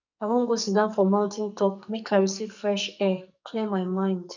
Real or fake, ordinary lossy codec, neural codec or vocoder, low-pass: fake; none; codec, 44.1 kHz, 2.6 kbps, SNAC; 7.2 kHz